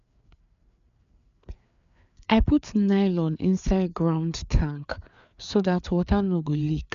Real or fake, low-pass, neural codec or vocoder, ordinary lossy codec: fake; 7.2 kHz; codec, 16 kHz, 4 kbps, FreqCodec, larger model; none